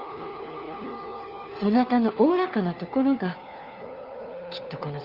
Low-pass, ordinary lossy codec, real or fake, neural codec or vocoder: 5.4 kHz; Opus, 32 kbps; fake; codec, 16 kHz, 4 kbps, FunCodec, trained on LibriTTS, 50 frames a second